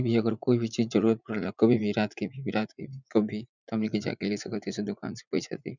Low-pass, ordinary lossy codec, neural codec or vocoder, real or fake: 7.2 kHz; none; vocoder, 44.1 kHz, 80 mel bands, Vocos; fake